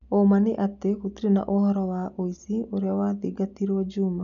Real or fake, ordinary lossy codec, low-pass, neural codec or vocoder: real; none; 7.2 kHz; none